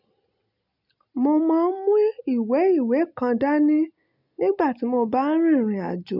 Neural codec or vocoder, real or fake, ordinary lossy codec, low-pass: none; real; none; 5.4 kHz